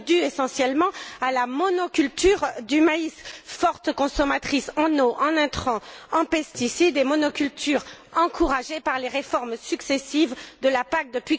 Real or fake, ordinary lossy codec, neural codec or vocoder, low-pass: real; none; none; none